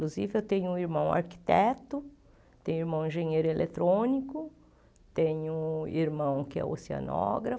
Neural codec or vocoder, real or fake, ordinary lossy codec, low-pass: none; real; none; none